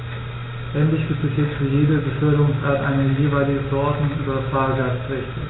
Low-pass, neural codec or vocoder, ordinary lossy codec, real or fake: 7.2 kHz; none; AAC, 16 kbps; real